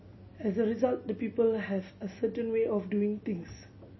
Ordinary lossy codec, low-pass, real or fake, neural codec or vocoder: MP3, 24 kbps; 7.2 kHz; real; none